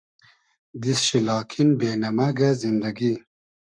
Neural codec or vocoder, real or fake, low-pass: codec, 44.1 kHz, 7.8 kbps, Pupu-Codec; fake; 9.9 kHz